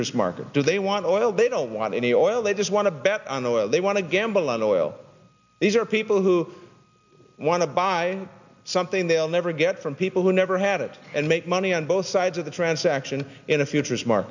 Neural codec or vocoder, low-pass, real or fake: none; 7.2 kHz; real